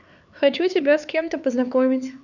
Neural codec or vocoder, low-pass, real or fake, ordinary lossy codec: codec, 16 kHz, 4 kbps, X-Codec, HuBERT features, trained on LibriSpeech; 7.2 kHz; fake; none